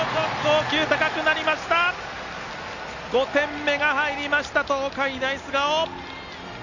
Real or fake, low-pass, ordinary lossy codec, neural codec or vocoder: real; 7.2 kHz; Opus, 64 kbps; none